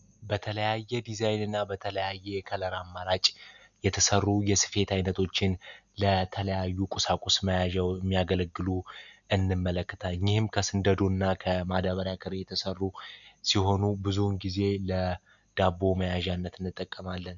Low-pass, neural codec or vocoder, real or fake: 7.2 kHz; none; real